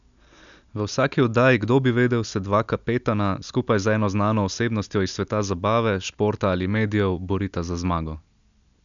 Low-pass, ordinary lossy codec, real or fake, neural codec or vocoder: 7.2 kHz; none; real; none